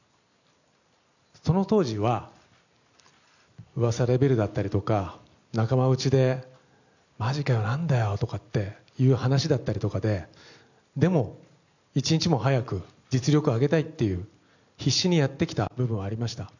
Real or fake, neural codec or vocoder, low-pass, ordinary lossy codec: real; none; 7.2 kHz; none